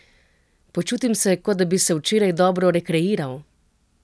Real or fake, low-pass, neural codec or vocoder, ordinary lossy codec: real; none; none; none